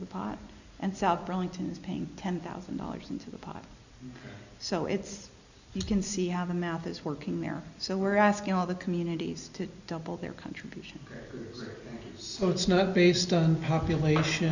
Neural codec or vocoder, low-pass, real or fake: none; 7.2 kHz; real